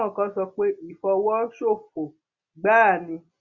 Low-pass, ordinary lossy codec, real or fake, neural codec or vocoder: 7.2 kHz; Opus, 64 kbps; real; none